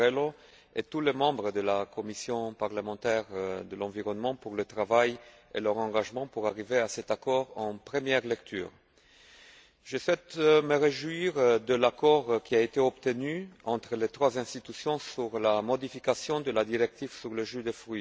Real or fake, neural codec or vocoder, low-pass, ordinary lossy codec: real; none; none; none